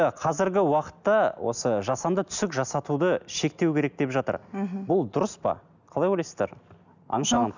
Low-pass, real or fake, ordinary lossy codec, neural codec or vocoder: 7.2 kHz; real; none; none